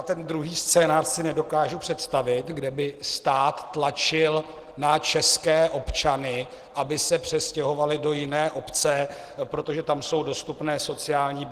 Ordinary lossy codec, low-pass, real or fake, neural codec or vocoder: Opus, 16 kbps; 14.4 kHz; real; none